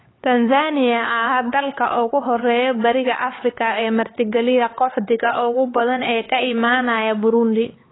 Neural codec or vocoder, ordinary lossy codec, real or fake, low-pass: codec, 16 kHz, 4 kbps, X-Codec, HuBERT features, trained on LibriSpeech; AAC, 16 kbps; fake; 7.2 kHz